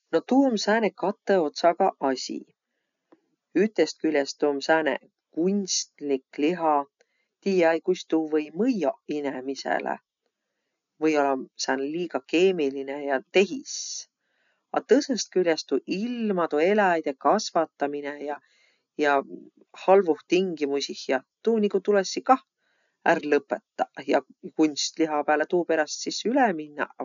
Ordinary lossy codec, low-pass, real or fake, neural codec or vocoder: none; 7.2 kHz; real; none